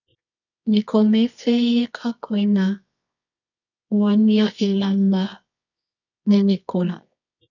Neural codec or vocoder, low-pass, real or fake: codec, 24 kHz, 0.9 kbps, WavTokenizer, medium music audio release; 7.2 kHz; fake